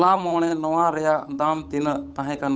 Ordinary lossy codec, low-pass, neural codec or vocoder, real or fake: none; none; codec, 16 kHz, 8 kbps, FunCodec, trained on Chinese and English, 25 frames a second; fake